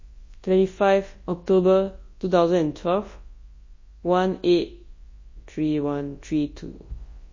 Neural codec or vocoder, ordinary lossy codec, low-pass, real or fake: codec, 24 kHz, 0.9 kbps, WavTokenizer, large speech release; MP3, 32 kbps; 7.2 kHz; fake